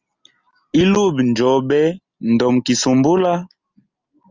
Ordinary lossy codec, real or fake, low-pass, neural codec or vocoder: Opus, 32 kbps; real; 7.2 kHz; none